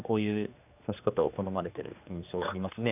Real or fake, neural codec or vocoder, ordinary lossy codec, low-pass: fake; codec, 16 kHz, 2 kbps, X-Codec, HuBERT features, trained on general audio; none; 3.6 kHz